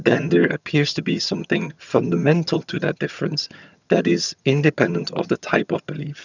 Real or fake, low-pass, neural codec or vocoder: fake; 7.2 kHz; vocoder, 22.05 kHz, 80 mel bands, HiFi-GAN